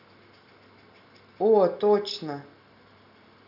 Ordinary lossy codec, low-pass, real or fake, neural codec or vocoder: none; 5.4 kHz; real; none